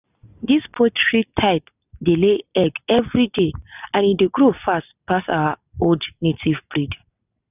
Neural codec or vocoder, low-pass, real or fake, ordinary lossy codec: none; 3.6 kHz; real; none